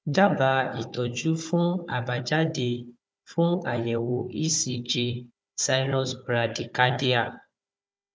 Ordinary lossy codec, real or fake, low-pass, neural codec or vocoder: none; fake; none; codec, 16 kHz, 4 kbps, FunCodec, trained on Chinese and English, 50 frames a second